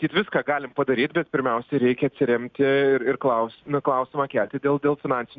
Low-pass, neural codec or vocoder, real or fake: 7.2 kHz; none; real